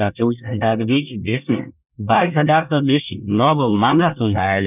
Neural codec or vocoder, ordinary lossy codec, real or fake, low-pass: codec, 24 kHz, 1 kbps, SNAC; none; fake; 3.6 kHz